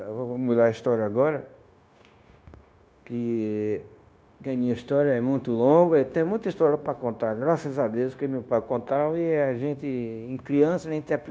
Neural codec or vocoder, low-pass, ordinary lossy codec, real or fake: codec, 16 kHz, 0.9 kbps, LongCat-Audio-Codec; none; none; fake